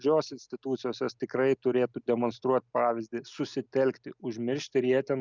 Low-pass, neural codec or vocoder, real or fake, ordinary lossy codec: 7.2 kHz; none; real; Opus, 64 kbps